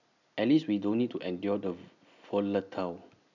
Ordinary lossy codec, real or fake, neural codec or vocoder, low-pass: none; real; none; 7.2 kHz